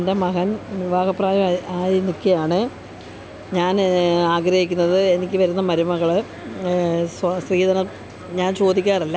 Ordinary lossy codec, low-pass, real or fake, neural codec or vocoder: none; none; real; none